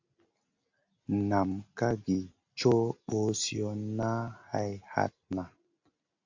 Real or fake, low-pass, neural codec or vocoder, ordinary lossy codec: real; 7.2 kHz; none; AAC, 48 kbps